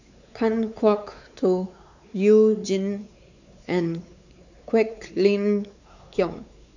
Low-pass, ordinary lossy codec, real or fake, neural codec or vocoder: 7.2 kHz; none; fake; codec, 16 kHz, 4 kbps, X-Codec, WavLM features, trained on Multilingual LibriSpeech